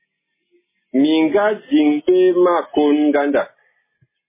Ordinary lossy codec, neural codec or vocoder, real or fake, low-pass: MP3, 16 kbps; none; real; 3.6 kHz